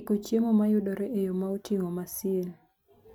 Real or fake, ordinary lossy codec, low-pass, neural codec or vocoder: real; none; 19.8 kHz; none